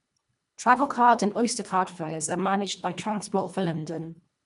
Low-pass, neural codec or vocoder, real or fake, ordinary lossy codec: 10.8 kHz; codec, 24 kHz, 1.5 kbps, HILCodec; fake; none